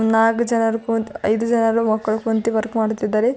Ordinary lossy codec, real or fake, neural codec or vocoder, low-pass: none; real; none; none